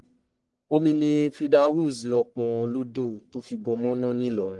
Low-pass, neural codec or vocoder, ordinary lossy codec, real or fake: 10.8 kHz; codec, 44.1 kHz, 1.7 kbps, Pupu-Codec; Opus, 24 kbps; fake